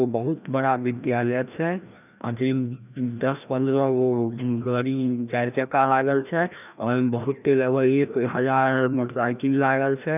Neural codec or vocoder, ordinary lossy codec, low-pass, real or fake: codec, 16 kHz, 1 kbps, FreqCodec, larger model; none; 3.6 kHz; fake